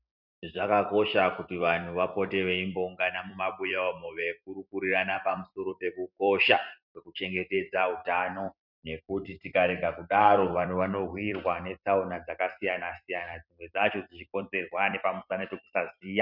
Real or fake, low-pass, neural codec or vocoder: fake; 5.4 kHz; vocoder, 44.1 kHz, 128 mel bands every 256 samples, BigVGAN v2